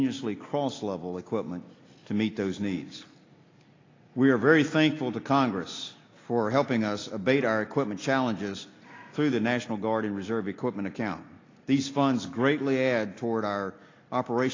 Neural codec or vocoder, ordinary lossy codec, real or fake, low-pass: none; AAC, 32 kbps; real; 7.2 kHz